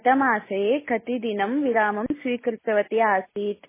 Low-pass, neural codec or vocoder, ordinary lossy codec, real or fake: 3.6 kHz; none; MP3, 16 kbps; real